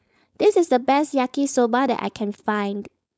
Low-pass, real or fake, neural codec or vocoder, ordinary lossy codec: none; fake; codec, 16 kHz, 4.8 kbps, FACodec; none